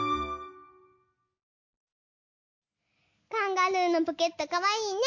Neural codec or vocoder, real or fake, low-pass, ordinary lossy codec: none; real; 7.2 kHz; MP3, 48 kbps